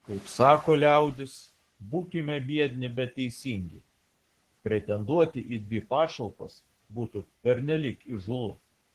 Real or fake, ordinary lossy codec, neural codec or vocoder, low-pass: fake; Opus, 16 kbps; codec, 44.1 kHz, 3.4 kbps, Pupu-Codec; 14.4 kHz